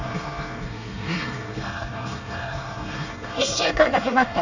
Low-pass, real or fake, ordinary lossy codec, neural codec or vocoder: 7.2 kHz; fake; none; codec, 24 kHz, 1 kbps, SNAC